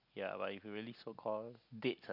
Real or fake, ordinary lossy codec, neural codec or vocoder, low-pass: real; none; none; 5.4 kHz